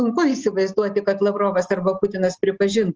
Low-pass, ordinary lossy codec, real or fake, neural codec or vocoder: 7.2 kHz; Opus, 24 kbps; real; none